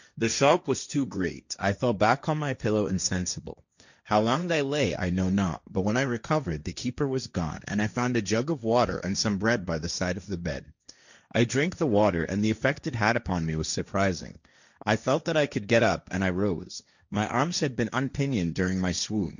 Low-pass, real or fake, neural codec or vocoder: 7.2 kHz; fake; codec, 16 kHz, 1.1 kbps, Voila-Tokenizer